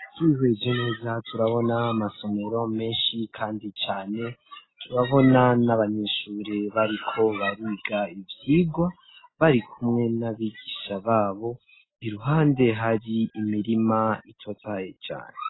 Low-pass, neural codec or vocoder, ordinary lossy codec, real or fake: 7.2 kHz; none; AAC, 16 kbps; real